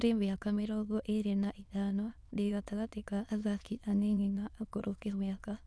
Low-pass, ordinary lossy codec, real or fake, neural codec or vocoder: none; none; fake; autoencoder, 22.05 kHz, a latent of 192 numbers a frame, VITS, trained on many speakers